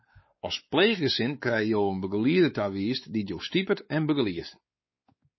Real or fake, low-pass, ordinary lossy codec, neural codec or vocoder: fake; 7.2 kHz; MP3, 24 kbps; codec, 16 kHz, 4 kbps, X-Codec, WavLM features, trained on Multilingual LibriSpeech